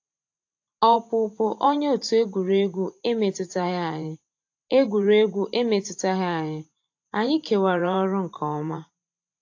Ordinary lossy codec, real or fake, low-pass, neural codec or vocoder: AAC, 48 kbps; fake; 7.2 kHz; vocoder, 44.1 kHz, 128 mel bands every 512 samples, BigVGAN v2